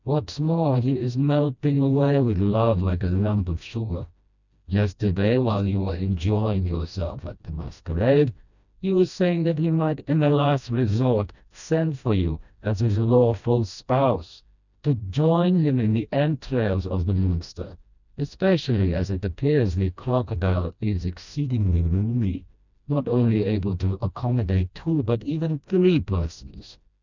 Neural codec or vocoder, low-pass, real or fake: codec, 16 kHz, 1 kbps, FreqCodec, smaller model; 7.2 kHz; fake